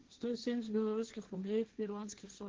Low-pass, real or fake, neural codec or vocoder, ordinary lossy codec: 7.2 kHz; fake; codec, 16 kHz, 1.1 kbps, Voila-Tokenizer; Opus, 16 kbps